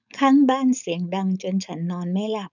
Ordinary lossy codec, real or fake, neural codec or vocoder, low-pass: none; fake; codec, 16 kHz, 4.8 kbps, FACodec; 7.2 kHz